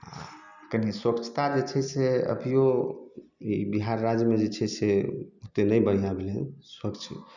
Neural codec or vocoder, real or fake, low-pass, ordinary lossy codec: none; real; 7.2 kHz; none